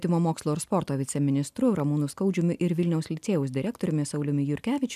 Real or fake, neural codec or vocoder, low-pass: real; none; 14.4 kHz